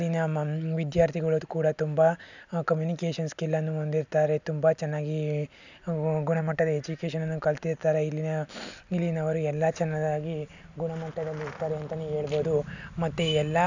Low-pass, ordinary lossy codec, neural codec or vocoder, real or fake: 7.2 kHz; none; none; real